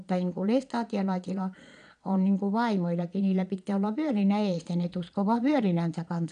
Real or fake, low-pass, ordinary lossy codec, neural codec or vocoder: fake; 9.9 kHz; none; vocoder, 22.05 kHz, 80 mel bands, Vocos